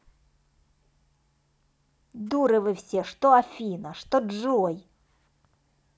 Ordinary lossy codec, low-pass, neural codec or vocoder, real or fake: none; none; none; real